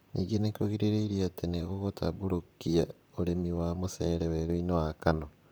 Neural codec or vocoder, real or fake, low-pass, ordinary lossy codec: vocoder, 44.1 kHz, 128 mel bands, Pupu-Vocoder; fake; none; none